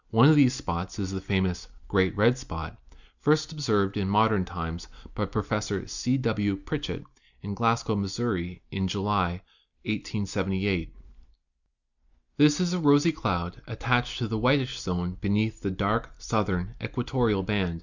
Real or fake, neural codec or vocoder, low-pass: real; none; 7.2 kHz